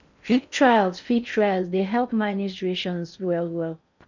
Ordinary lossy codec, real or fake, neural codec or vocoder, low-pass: none; fake; codec, 16 kHz in and 24 kHz out, 0.6 kbps, FocalCodec, streaming, 4096 codes; 7.2 kHz